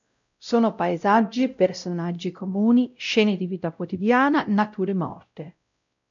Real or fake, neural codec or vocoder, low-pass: fake; codec, 16 kHz, 0.5 kbps, X-Codec, WavLM features, trained on Multilingual LibriSpeech; 7.2 kHz